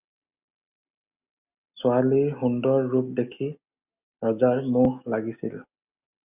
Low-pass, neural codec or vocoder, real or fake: 3.6 kHz; none; real